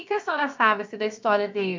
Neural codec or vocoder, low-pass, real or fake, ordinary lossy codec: codec, 16 kHz, about 1 kbps, DyCAST, with the encoder's durations; 7.2 kHz; fake; MP3, 64 kbps